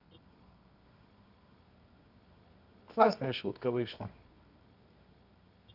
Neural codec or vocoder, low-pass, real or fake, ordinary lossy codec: codec, 24 kHz, 0.9 kbps, WavTokenizer, medium music audio release; 5.4 kHz; fake; none